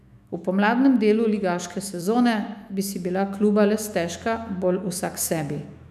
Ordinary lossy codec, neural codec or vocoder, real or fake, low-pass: none; autoencoder, 48 kHz, 128 numbers a frame, DAC-VAE, trained on Japanese speech; fake; 14.4 kHz